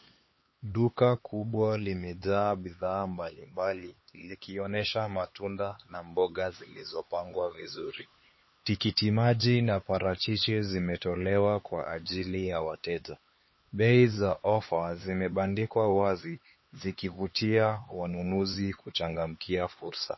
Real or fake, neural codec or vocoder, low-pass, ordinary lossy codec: fake; codec, 16 kHz, 4 kbps, X-Codec, HuBERT features, trained on LibriSpeech; 7.2 kHz; MP3, 24 kbps